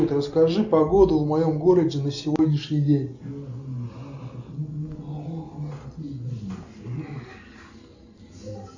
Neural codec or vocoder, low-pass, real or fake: none; 7.2 kHz; real